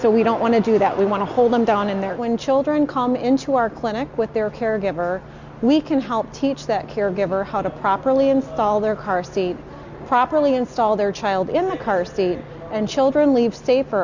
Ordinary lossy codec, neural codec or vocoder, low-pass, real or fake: Opus, 64 kbps; none; 7.2 kHz; real